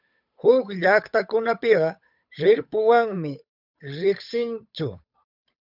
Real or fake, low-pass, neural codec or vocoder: fake; 5.4 kHz; codec, 16 kHz, 8 kbps, FunCodec, trained on Chinese and English, 25 frames a second